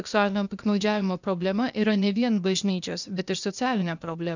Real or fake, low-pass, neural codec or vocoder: fake; 7.2 kHz; codec, 16 kHz, 0.8 kbps, ZipCodec